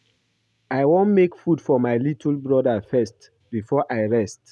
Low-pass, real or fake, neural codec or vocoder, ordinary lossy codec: none; real; none; none